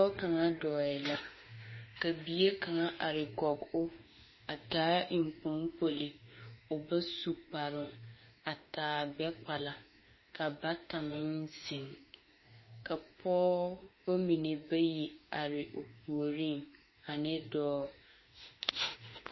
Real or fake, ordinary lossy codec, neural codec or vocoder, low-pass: fake; MP3, 24 kbps; autoencoder, 48 kHz, 32 numbers a frame, DAC-VAE, trained on Japanese speech; 7.2 kHz